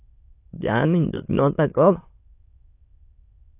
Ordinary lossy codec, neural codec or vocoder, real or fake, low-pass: AAC, 24 kbps; autoencoder, 22.05 kHz, a latent of 192 numbers a frame, VITS, trained on many speakers; fake; 3.6 kHz